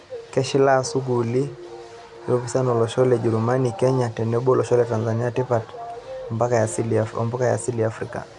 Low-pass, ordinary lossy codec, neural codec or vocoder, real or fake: 10.8 kHz; none; none; real